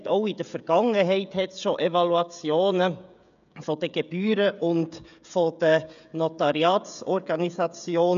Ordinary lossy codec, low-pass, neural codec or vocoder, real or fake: none; 7.2 kHz; codec, 16 kHz, 16 kbps, FreqCodec, smaller model; fake